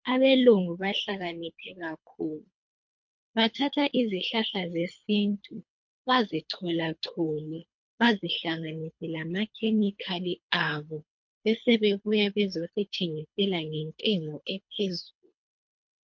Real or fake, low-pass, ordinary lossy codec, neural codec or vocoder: fake; 7.2 kHz; MP3, 48 kbps; codec, 24 kHz, 3 kbps, HILCodec